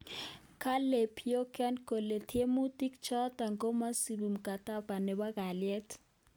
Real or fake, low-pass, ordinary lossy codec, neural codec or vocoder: real; none; none; none